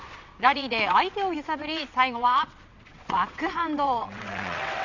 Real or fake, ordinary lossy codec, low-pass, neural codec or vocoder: fake; none; 7.2 kHz; vocoder, 22.05 kHz, 80 mel bands, WaveNeXt